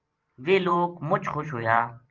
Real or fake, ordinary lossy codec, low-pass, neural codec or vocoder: fake; Opus, 32 kbps; 7.2 kHz; vocoder, 44.1 kHz, 128 mel bands every 512 samples, BigVGAN v2